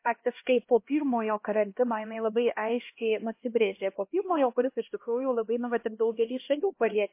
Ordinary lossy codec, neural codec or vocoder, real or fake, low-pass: MP3, 24 kbps; codec, 16 kHz, 1 kbps, X-Codec, HuBERT features, trained on LibriSpeech; fake; 3.6 kHz